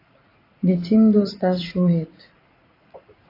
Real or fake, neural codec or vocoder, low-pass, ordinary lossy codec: fake; vocoder, 44.1 kHz, 80 mel bands, Vocos; 5.4 kHz; AAC, 24 kbps